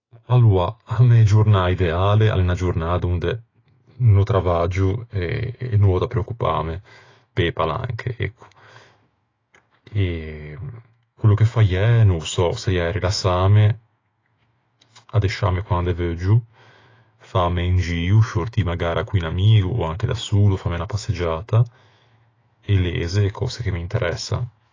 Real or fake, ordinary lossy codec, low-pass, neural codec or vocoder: fake; AAC, 32 kbps; 7.2 kHz; autoencoder, 48 kHz, 128 numbers a frame, DAC-VAE, trained on Japanese speech